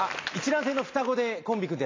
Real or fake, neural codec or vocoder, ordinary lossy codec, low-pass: real; none; AAC, 32 kbps; 7.2 kHz